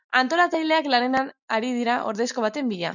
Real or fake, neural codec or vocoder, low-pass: real; none; 7.2 kHz